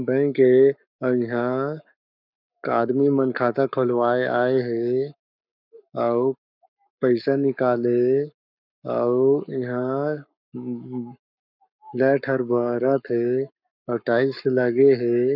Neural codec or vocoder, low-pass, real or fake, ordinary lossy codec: codec, 44.1 kHz, 7.8 kbps, Pupu-Codec; 5.4 kHz; fake; none